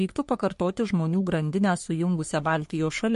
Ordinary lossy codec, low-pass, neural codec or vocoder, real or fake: MP3, 48 kbps; 14.4 kHz; codec, 44.1 kHz, 3.4 kbps, Pupu-Codec; fake